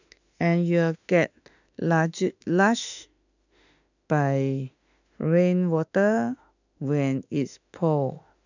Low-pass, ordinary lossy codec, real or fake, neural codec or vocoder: 7.2 kHz; none; fake; autoencoder, 48 kHz, 32 numbers a frame, DAC-VAE, trained on Japanese speech